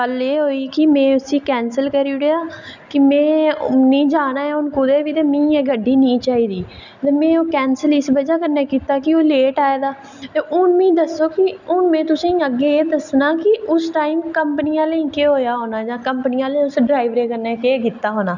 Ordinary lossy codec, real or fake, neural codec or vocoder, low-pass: none; real; none; 7.2 kHz